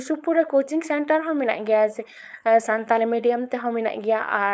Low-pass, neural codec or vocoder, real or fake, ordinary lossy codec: none; codec, 16 kHz, 4.8 kbps, FACodec; fake; none